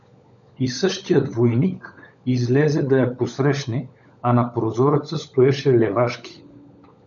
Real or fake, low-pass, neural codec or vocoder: fake; 7.2 kHz; codec, 16 kHz, 16 kbps, FunCodec, trained on LibriTTS, 50 frames a second